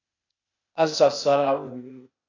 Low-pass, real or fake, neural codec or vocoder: 7.2 kHz; fake; codec, 16 kHz, 0.8 kbps, ZipCodec